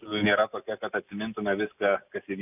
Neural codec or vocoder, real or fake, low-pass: none; real; 3.6 kHz